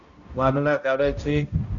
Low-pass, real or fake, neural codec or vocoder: 7.2 kHz; fake; codec, 16 kHz, 0.5 kbps, X-Codec, HuBERT features, trained on balanced general audio